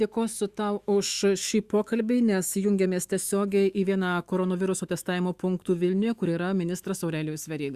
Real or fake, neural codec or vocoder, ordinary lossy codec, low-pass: fake; autoencoder, 48 kHz, 128 numbers a frame, DAC-VAE, trained on Japanese speech; Opus, 64 kbps; 14.4 kHz